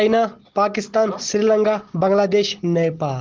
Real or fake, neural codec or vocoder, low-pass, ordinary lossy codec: real; none; 7.2 kHz; Opus, 16 kbps